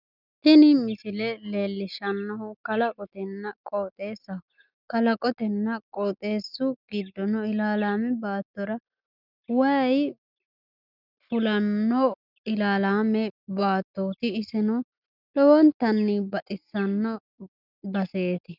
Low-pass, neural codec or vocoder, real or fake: 5.4 kHz; none; real